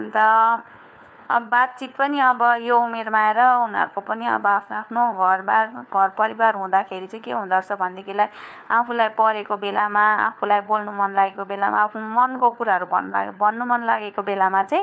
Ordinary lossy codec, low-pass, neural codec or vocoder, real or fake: none; none; codec, 16 kHz, 4 kbps, FunCodec, trained on LibriTTS, 50 frames a second; fake